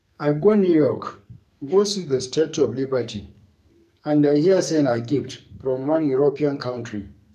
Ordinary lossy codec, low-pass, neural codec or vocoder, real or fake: none; 14.4 kHz; codec, 44.1 kHz, 2.6 kbps, SNAC; fake